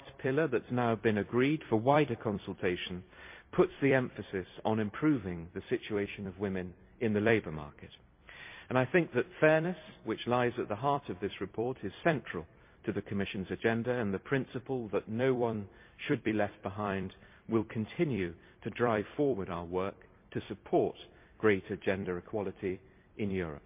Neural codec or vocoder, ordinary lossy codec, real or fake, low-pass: vocoder, 44.1 kHz, 128 mel bands every 256 samples, BigVGAN v2; MP3, 32 kbps; fake; 3.6 kHz